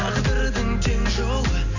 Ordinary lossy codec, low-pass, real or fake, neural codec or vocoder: none; 7.2 kHz; real; none